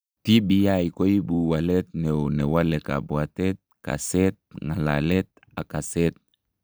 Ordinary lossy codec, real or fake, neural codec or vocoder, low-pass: none; real; none; none